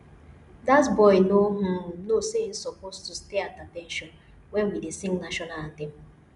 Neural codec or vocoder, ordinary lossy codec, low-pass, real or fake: none; none; 10.8 kHz; real